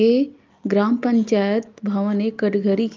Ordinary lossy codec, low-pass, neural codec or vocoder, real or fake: Opus, 24 kbps; 7.2 kHz; none; real